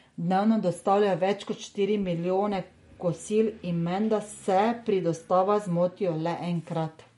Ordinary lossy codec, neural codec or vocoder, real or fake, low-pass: MP3, 48 kbps; none; real; 19.8 kHz